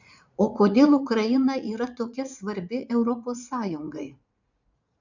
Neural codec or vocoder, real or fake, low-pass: vocoder, 44.1 kHz, 80 mel bands, Vocos; fake; 7.2 kHz